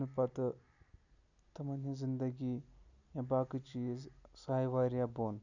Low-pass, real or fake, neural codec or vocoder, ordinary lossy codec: 7.2 kHz; real; none; none